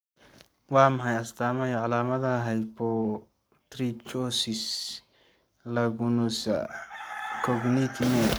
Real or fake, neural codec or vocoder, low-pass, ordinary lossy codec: fake; codec, 44.1 kHz, 7.8 kbps, Pupu-Codec; none; none